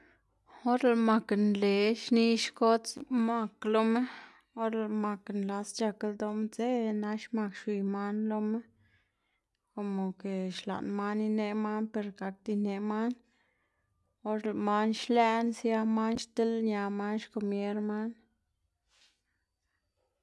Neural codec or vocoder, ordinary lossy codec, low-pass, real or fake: none; none; none; real